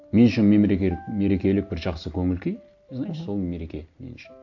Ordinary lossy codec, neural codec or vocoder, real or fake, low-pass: AAC, 48 kbps; none; real; 7.2 kHz